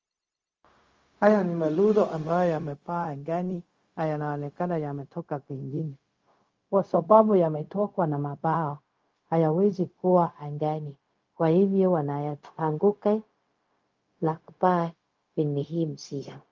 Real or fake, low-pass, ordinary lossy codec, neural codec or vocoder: fake; 7.2 kHz; Opus, 32 kbps; codec, 16 kHz, 0.4 kbps, LongCat-Audio-Codec